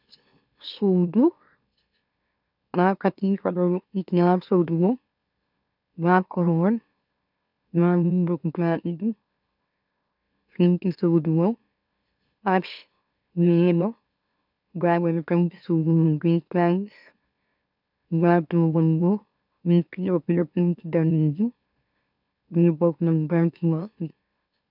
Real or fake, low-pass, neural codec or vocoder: fake; 5.4 kHz; autoencoder, 44.1 kHz, a latent of 192 numbers a frame, MeloTTS